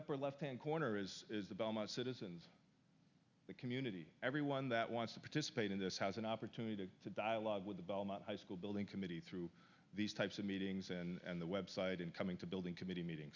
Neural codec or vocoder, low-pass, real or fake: none; 7.2 kHz; real